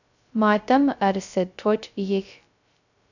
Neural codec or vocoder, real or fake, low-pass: codec, 16 kHz, 0.2 kbps, FocalCodec; fake; 7.2 kHz